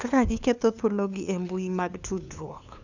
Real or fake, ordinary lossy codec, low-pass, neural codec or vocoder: fake; none; 7.2 kHz; codec, 16 kHz, 2 kbps, FunCodec, trained on LibriTTS, 25 frames a second